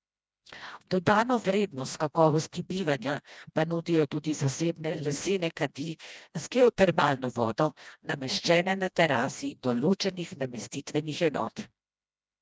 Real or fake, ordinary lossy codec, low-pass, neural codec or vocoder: fake; none; none; codec, 16 kHz, 1 kbps, FreqCodec, smaller model